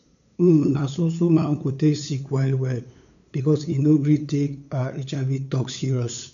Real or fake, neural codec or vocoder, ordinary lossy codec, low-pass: fake; codec, 16 kHz, 8 kbps, FunCodec, trained on LibriTTS, 25 frames a second; none; 7.2 kHz